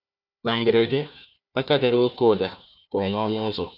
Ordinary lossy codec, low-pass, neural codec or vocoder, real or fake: none; 5.4 kHz; codec, 16 kHz, 1 kbps, FunCodec, trained on Chinese and English, 50 frames a second; fake